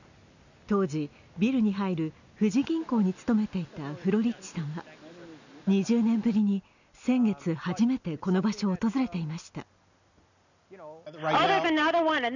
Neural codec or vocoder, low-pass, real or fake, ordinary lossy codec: none; 7.2 kHz; real; none